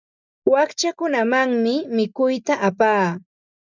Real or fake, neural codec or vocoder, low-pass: real; none; 7.2 kHz